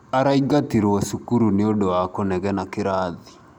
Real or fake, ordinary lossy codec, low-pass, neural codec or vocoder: real; none; 19.8 kHz; none